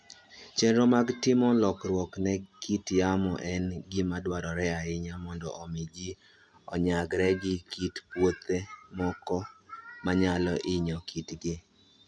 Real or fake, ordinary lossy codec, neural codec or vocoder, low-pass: real; none; none; none